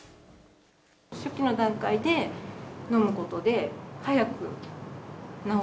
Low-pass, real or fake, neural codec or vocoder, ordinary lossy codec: none; real; none; none